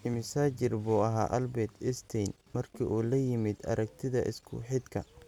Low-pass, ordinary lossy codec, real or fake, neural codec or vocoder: 19.8 kHz; none; real; none